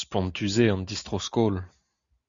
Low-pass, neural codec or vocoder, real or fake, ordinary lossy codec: 7.2 kHz; none; real; Opus, 64 kbps